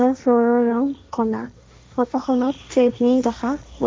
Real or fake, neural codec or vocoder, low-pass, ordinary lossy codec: fake; codec, 16 kHz, 1.1 kbps, Voila-Tokenizer; none; none